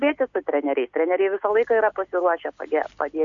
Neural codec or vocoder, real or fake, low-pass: none; real; 7.2 kHz